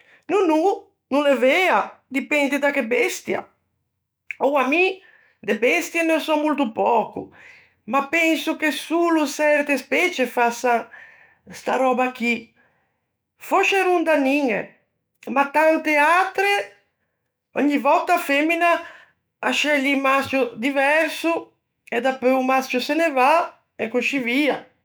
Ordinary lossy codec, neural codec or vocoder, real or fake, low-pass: none; autoencoder, 48 kHz, 128 numbers a frame, DAC-VAE, trained on Japanese speech; fake; none